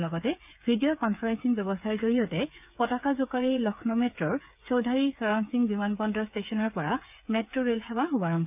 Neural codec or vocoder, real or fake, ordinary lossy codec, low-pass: codec, 16 kHz, 8 kbps, FreqCodec, smaller model; fake; none; 3.6 kHz